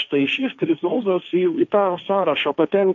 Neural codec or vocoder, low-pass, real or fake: codec, 16 kHz, 1.1 kbps, Voila-Tokenizer; 7.2 kHz; fake